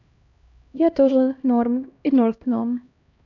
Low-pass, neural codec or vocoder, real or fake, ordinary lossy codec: 7.2 kHz; codec, 16 kHz, 1 kbps, X-Codec, HuBERT features, trained on LibriSpeech; fake; none